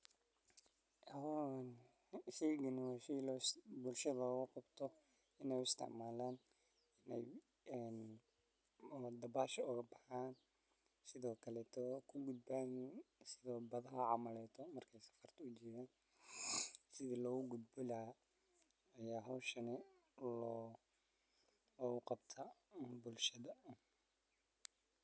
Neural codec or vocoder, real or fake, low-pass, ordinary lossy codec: none; real; none; none